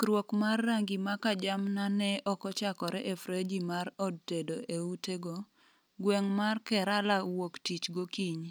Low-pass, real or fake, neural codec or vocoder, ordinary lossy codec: none; real; none; none